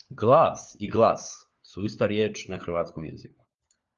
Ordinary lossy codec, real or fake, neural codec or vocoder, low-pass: Opus, 32 kbps; fake; codec, 16 kHz, 4 kbps, FunCodec, trained on LibriTTS, 50 frames a second; 7.2 kHz